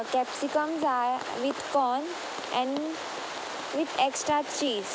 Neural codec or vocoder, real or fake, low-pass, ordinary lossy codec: none; real; none; none